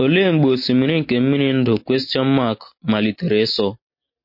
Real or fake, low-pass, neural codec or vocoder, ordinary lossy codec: real; 5.4 kHz; none; MP3, 32 kbps